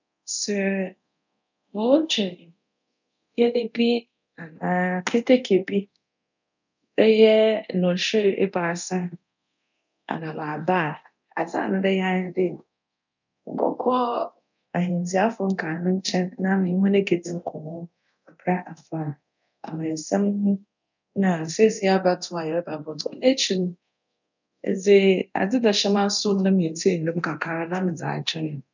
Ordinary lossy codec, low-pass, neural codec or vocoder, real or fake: none; 7.2 kHz; codec, 24 kHz, 0.9 kbps, DualCodec; fake